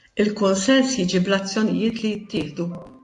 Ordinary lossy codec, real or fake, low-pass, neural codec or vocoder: AAC, 48 kbps; real; 10.8 kHz; none